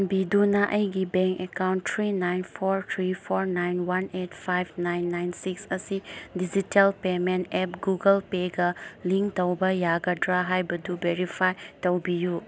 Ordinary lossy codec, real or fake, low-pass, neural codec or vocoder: none; real; none; none